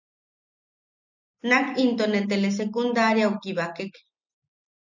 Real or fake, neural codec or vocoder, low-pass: real; none; 7.2 kHz